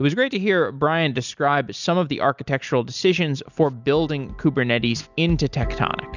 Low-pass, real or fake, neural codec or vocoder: 7.2 kHz; real; none